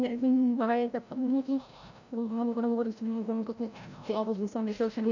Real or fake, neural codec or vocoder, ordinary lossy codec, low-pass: fake; codec, 16 kHz, 0.5 kbps, FreqCodec, larger model; none; 7.2 kHz